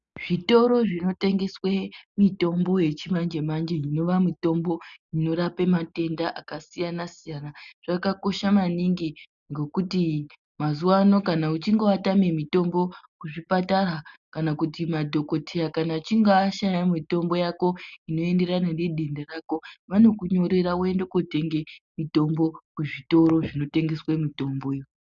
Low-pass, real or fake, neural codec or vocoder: 7.2 kHz; real; none